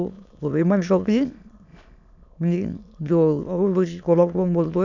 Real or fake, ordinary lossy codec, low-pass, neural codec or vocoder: fake; none; 7.2 kHz; autoencoder, 22.05 kHz, a latent of 192 numbers a frame, VITS, trained on many speakers